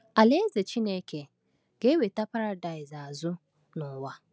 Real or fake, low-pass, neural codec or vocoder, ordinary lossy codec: real; none; none; none